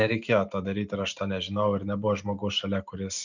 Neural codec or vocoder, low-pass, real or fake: none; 7.2 kHz; real